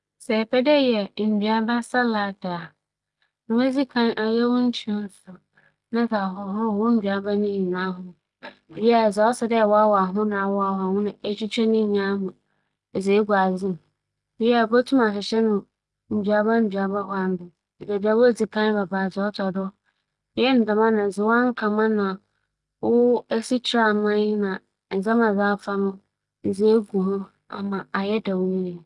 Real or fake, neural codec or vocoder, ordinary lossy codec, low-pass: real; none; Opus, 32 kbps; 10.8 kHz